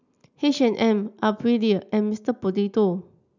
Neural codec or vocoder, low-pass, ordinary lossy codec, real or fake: none; 7.2 kHz; none; real